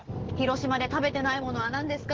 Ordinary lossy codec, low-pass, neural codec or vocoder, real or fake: Opus, 16 kbps; 7.2 kHz; none; real